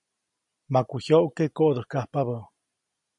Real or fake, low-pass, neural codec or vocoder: real; 10.8 kHz; none